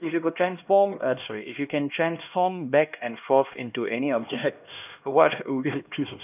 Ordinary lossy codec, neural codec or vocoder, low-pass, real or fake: none; codec, 16 kHz, 1 kbps, X-Codec, HuBERT features, trained on LibriSpeech; 3.6 kHz; fake